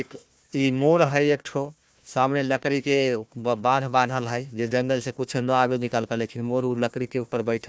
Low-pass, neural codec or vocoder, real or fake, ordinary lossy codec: none; codec, 16 kHz, 1 kbps, FunCodec, trained on Chinese and English, 50 frames a second; fake; none